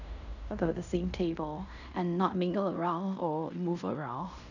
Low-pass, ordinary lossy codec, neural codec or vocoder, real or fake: 7.2 kHz; none; codec, 16 kHz in and 24 kHz out, 0.9 kbps, LongCat-Audio-Codec, fine tuned four codebook decoder; fake